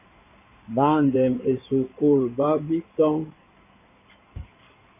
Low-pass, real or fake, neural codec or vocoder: 3.6 kHz; fake; codec, 16 kHz in and 24 kHz out, 2.2 kbps, FireRedTTS-2 codec